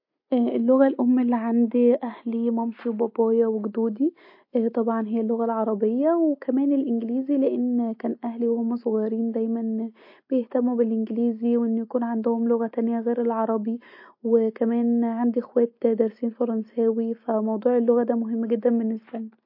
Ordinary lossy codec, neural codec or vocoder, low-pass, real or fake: MP3, 32 kbps; none; 5.4 kHz; real